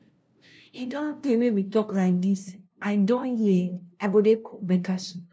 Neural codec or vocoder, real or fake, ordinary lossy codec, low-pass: codec, 16 kHz, 0.5 kbps, FunCodec, trained on LibriTTS, 25 frames a second; fake; none; none